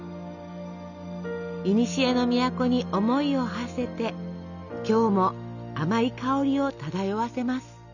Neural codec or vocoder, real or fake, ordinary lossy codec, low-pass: none; real; none; 7.2 kHz